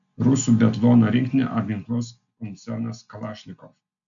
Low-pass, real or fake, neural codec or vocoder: 7.2 kHz; real; none